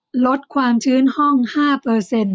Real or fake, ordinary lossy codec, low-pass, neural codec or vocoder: real; none; none; none